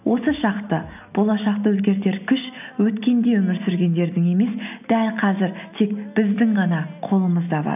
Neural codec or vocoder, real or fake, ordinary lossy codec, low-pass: none; real; none; 3.6 kHz